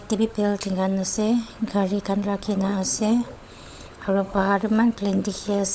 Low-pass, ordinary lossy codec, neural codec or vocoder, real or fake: none; none; codec, 16 kHz, 16 kbps, FunCodec, trained on LibriTTS, 50 frames a second; fake